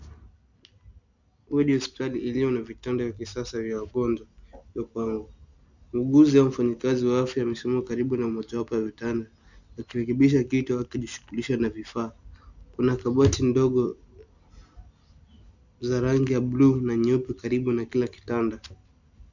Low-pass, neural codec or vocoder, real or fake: 7.2 kHz; none; real